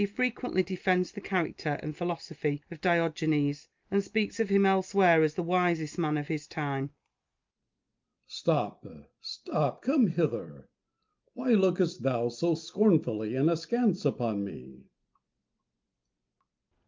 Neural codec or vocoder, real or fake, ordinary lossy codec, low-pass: none; real; Opus, 24 kbps; 7.2 kHz